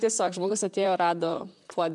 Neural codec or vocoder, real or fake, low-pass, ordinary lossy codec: vocoder, 44.1 kHz, 128 mel bands, Pupu-Vocoder; fake; 10.8 kHz; MP3, 96 kbps